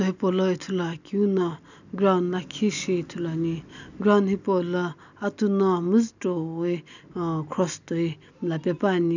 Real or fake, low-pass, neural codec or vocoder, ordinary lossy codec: real; 7.2 kHz; none; none